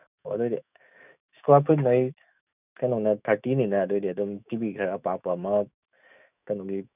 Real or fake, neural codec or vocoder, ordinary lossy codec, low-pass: fake; autoencoder, 48 kHz, 128 numbers a frame, DAC-VAE, trained on Japanese speech; none; 3.6 kHz